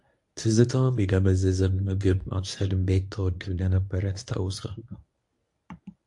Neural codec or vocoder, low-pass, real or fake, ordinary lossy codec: codec, 24 kHz, 0.9 kbps, WavTokenizer, medium speech release version 1; 10.8 kHz; fake; AAC, 64 kbps